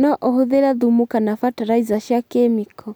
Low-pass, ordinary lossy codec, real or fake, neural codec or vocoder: none; none; real; none